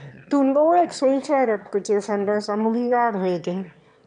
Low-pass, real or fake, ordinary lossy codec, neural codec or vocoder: 9.9 kHz; fake; none; autoencoder, 22.05 kHz, a latent of 192 numbers a frame, VITS, trained on one speaker